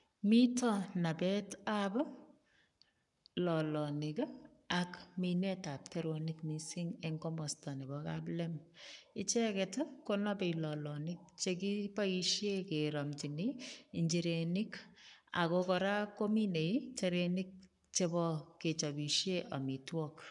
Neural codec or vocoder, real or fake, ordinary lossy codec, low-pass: codec, 44.1 kHz, 7.8 kbps, Pupu-Codec; fake; none; 10.8 kHz